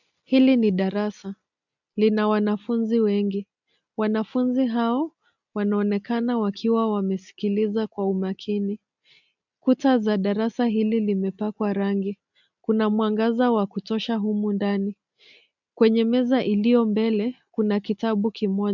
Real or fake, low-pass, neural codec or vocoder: real; 7.2 kHz; none